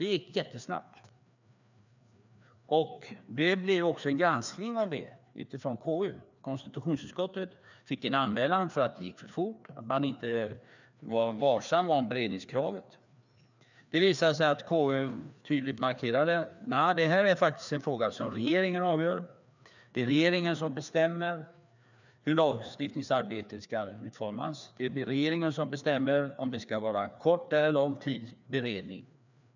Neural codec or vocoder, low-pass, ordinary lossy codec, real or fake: codec, 16 kHz, 2 kbps, FreqCodec, larger model; 7.2 kHz; none; fake